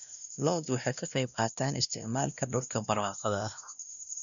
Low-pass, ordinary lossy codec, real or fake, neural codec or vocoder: 7.2 kHz; none; fake; codec, 16 kHz, 1 kbps, X-Codec, WavLM features, trained on Multilingual LibriSpeech